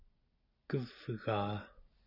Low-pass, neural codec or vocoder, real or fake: 5.4 kHz; none; real